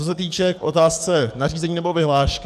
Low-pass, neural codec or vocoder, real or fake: 14.4 kHz; codec, 44.1 kHz, 7.8 kbps, DAC; fake